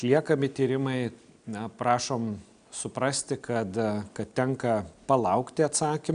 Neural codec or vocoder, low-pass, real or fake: none; 9.9 kHz; real